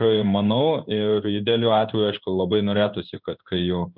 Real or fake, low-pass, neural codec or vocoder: fake; 5.4 kHz; codec, 16 kHz in and 24 kHz out, 1 kbps, XY-Tokenizer